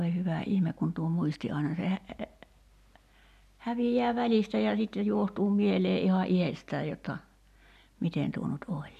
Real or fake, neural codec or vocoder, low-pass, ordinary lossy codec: real; none; 14.4 kHz; none